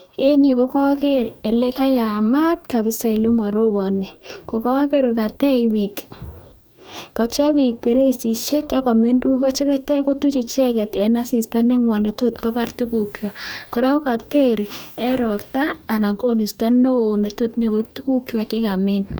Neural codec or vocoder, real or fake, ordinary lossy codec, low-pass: codec, 44.1 kHz, 2.6 kbps, DAC; fake; none; none